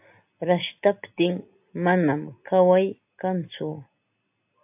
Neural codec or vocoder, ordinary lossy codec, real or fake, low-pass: none; AAC, 32 kbps; real; 3.6 kHz